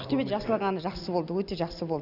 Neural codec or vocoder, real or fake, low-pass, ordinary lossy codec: none; real; 5.4 kHz; none